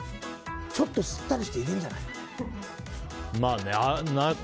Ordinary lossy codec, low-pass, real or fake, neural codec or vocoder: none; none; real; none